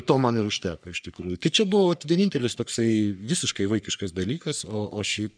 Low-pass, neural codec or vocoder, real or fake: 9.9 kHz; codec, 44.1 kHz, 3.4 kbps, Pupu-Codec; fake